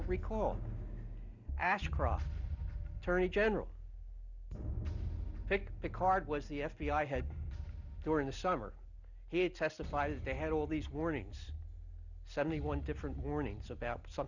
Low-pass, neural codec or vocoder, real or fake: 7.2 kHz; none; real